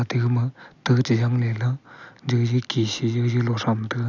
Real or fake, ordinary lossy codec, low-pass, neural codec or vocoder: real; none; 7.2 kHz; none